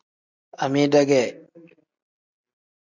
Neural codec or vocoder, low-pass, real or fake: none; 7.2 kHz; real